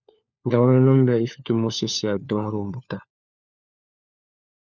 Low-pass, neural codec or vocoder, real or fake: 7.2 kHz; codec, 16 kHz, 4 kbps, FunCodec, trained on LibriTTS, 50 frames a second; fake